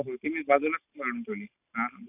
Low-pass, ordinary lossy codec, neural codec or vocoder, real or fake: 3.6 kHz; none; none; real